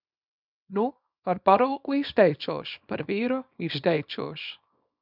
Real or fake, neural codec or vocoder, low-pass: fake; codec, 24 kHz, 0.9 kbps, WavTokenizer, small release; 5.4 kHz